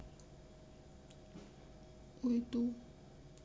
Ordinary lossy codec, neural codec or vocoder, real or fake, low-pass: none; none; real; none